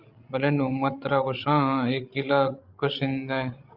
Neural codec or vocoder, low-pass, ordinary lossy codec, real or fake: codec, 16 kHz, 16 kbps, FreqCodec, larger model; 5.4 kHz; Opus, 24 kbps; fake